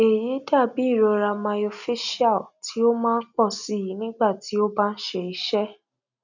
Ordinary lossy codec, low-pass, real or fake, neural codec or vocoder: none; 7.2 kHz; real; none